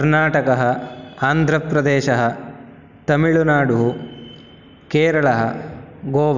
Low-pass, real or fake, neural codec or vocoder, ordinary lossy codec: 7.2 kHz; real; none; none